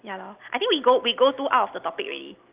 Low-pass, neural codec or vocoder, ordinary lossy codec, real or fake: 3.6 kHz; none; Opus, 24 kbps; real